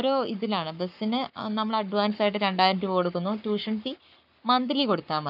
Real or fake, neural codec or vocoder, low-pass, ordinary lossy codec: fake; codec, 44.1 kHz, 7.8 kbps, Pupu-Codec; 5.4 kHz; none